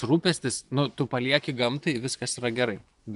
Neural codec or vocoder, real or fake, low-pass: vocoder, 24 kHz, 100 mel bands, Vocos; fake; 10.8 kHz